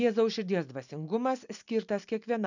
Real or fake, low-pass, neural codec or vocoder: real; 7.2 kHz; none